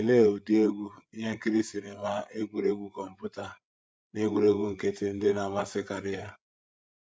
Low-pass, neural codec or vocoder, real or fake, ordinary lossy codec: none; codec, 16 kHz, 8 kbps, FreqCodec, larger model; fake; none